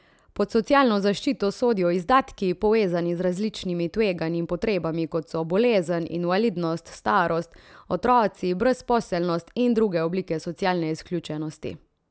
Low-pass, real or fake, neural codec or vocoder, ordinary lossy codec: none; real; none; none